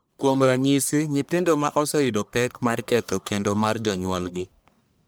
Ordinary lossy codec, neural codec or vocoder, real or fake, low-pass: none; codec, 44.1 kHz, 1.7 kbps, Pupu-Codec; fake; none